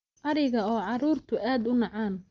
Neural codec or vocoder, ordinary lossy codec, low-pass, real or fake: none; Opus, 32 kbps; 7.2 kHz; real